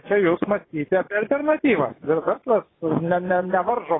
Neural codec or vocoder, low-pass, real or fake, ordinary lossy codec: vocoder, 24 kHz, 100 mel bands, Vocos; 7.2 kHz; fake; AAC, 16 kbps